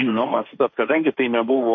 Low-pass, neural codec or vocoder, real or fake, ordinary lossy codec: 7.2 kHz; codec, 16 kHz, 1.1 kbps, Voila-Tokenizer; fake; MP3, 32 kbps